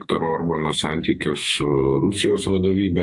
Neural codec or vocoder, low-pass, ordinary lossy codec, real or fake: codec, 32 kHz, 1.9 kbps, SNAC; 10.8 kHz; AAC, 64 kbps; fake